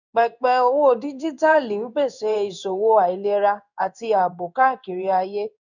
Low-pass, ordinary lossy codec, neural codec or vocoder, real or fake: 7.2 kHz; none; codec, 16 kHz in and 24 kHz out, 1 kbps, XY-Tokenizer; fake